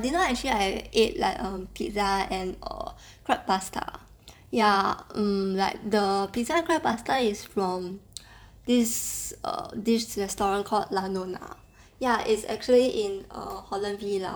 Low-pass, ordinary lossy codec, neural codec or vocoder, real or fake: none; none; vocoder, 44.1 kHz, 128 mel bands every 512 samples, BigVGAN v2; fake